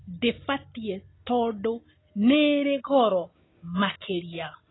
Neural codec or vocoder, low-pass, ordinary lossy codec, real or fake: none; 7.2 kHz; AAC, 16 kbps; real